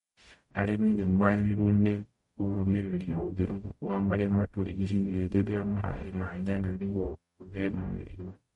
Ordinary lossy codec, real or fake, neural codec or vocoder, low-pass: MP3, 48 kbps; fake; codec, 44.1 kHz, 0.9 kbps, DAC; 19.8 kHz